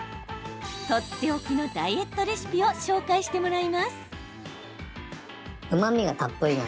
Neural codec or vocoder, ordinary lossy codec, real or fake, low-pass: none; none; real; none